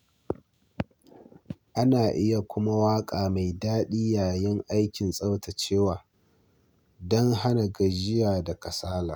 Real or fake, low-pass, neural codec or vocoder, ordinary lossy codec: real; none; none; none